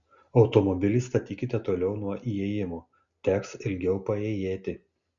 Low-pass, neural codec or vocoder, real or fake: 7.2 kHz; none; real